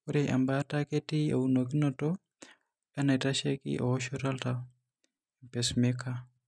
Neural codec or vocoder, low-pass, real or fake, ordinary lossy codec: none; none; real; none